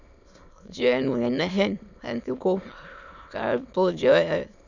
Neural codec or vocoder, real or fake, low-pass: autoencoder, 22.05 kHz, a latent of 192 numbers a frame, VITS, trained on many speakers; fake; 7.2 kHz